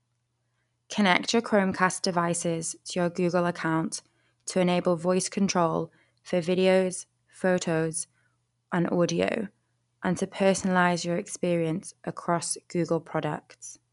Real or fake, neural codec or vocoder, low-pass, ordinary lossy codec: real; none; 10.8 kHz; none